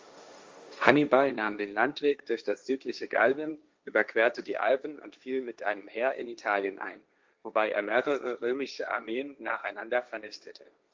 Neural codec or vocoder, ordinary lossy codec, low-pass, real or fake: codec, 16 kHz, 1.1 kbps, Voila-Tokenizer; Opus, 32 kbps; 7.2 kHz; fake